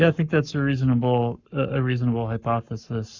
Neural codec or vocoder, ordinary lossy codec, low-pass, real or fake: codec, 44.1 kHz, 7.8 kbps, Pupu-Codec; Opus, 64 kbps; 7.2 kHz; fake